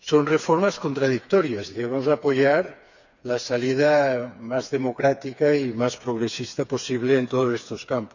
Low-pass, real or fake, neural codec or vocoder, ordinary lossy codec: 7.2 kHz; fake; codec, 16 kHz, 4 kbps, FreqCodec, smaller model; none